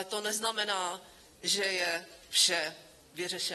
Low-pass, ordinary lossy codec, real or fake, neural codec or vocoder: 19.8 kHz; AAC, 32 kbps; real; none